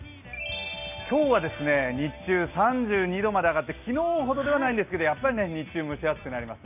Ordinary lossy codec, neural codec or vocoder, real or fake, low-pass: none; none; real; 3.6 kHz